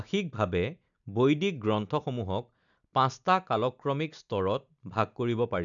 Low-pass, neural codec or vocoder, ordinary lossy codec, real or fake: 7.2 kHz; none; none; real